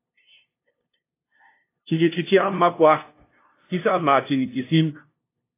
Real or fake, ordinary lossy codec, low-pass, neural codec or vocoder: fake; AAC, 24 kbps; 3.6 kHz; codec, 16 kHz, 0.5 kbps, FunCodec, trained on LibriTTS, 25 frames a second